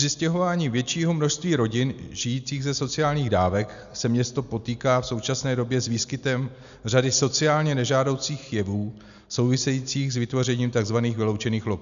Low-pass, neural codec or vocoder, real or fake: 7.2 kHz; none; real